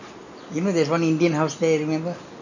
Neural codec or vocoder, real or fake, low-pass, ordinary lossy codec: none; real; 7.2 kHz; none